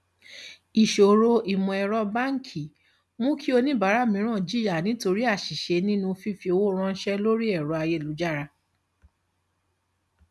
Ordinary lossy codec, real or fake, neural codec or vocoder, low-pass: none; real; none; none